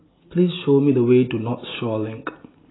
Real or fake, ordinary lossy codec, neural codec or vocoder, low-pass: real; AAC, 16 kbps; none; 7.2 kHz